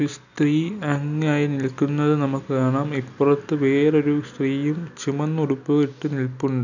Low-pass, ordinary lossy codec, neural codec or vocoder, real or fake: 7.2 kHz; none; none; real